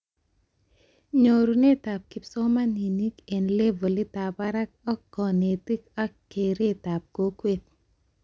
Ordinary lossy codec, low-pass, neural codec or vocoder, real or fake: none; none; none; real